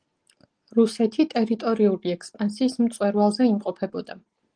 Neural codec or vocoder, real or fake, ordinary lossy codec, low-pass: none; real; Opus, 24 kbps; 9.9 kHz